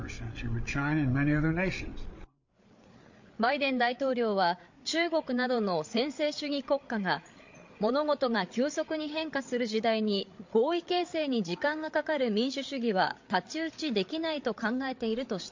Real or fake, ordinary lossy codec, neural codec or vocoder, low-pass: fake; MP3, 48 kbps; codec, 16 kHz, 8 kbps, FreqCodec, larger model; 7.2 kHz